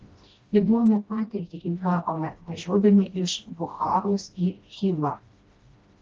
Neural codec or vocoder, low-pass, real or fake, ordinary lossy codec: codec, 16 kHz, 1 kbps, FreqCodec, smaller model; 7.2 kHz; fake; Opus, 32 kbps